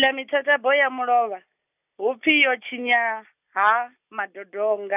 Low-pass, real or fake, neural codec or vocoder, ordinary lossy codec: 3.6 kHz; real; none; none